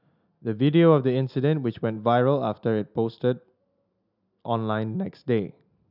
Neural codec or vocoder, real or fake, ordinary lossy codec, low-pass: none; real; none; 5.4 kHz